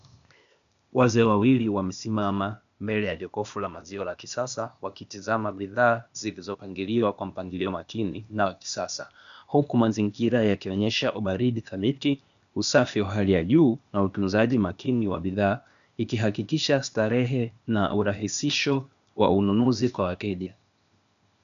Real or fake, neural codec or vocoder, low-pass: fake; codec, 16 kHz, 0.8 kbps, ZipCodec; 7.2 kHz